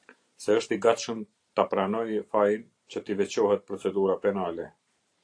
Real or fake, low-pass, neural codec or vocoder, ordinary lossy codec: fake; 9.9 kHz; vocoder, 44.1 kHz, 128 mel bands every 512 samples, BigVGAN v2; AAC, 48 kbps